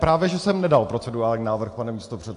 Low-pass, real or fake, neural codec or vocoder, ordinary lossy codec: 10.8 kHz; real; none; AAC, 64 kbps